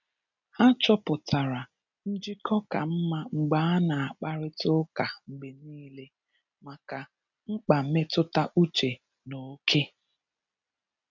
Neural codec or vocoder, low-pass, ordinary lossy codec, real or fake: none; 7.2 kHz; none; real